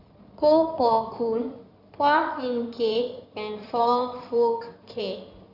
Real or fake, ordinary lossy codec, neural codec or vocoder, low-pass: fake; none; codec, 16 kHz in and 24 kHz out, 2.2 kbps, FireRedTTS-2 codec; 5.4 kHz